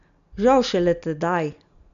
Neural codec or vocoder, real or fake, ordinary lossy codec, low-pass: none; real; none; 7.2 kHz